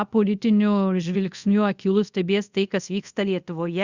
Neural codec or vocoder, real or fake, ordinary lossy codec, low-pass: codec, 24 kHz, 0.5 kbps, DualCodec; fake; Opus, 64 kbps; 7.2 kHz